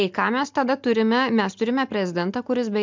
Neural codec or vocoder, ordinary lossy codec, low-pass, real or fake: none; MP3, 64 kbps; 7.2 kHz; real